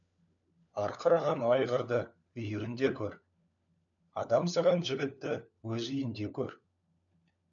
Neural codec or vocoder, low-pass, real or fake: codec, 16 kHz, 4 kbps, FunCodec, trained on LibriTTS, 50 frames a second; 7.2 kHz; fake